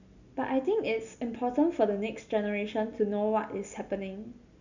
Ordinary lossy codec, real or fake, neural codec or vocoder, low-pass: none; real; none; 7.2 kHz